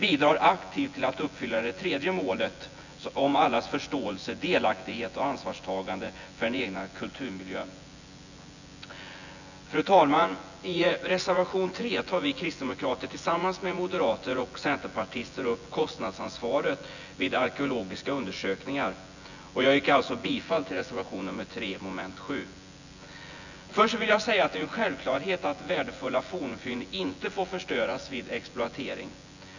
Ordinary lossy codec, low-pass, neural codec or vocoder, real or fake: none; 7.2 kHz; vocoder, 24 kHz, 100 mel bands, Vocos; fake